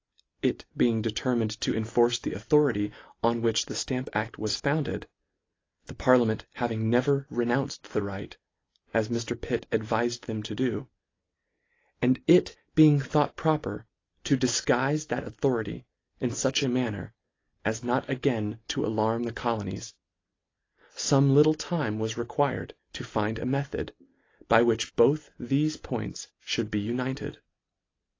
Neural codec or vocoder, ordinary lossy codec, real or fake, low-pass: none; AAC, 32 kbps; real; 7.2 kHz